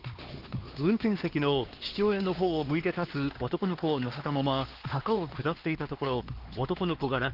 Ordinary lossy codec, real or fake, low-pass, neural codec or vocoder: Opus, 16 kbps; fake; 5.4 kHz; codec, 16 kHz, 2 kbps, X-Codec, HuBERT features, trained on LibriSpeech